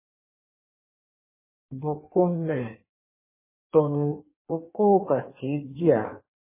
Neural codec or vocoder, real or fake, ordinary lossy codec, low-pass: codec, 16 kHz in and 24 kHz out, 1.1 kbps, FireRedTTS-2 codec; fake; MP3, 16 kbps; 3.6 kHz